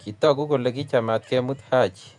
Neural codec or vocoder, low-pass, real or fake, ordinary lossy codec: vocoder, 48 kHz, 128 mel bands, Vocos; 10.8 kHz; fake; none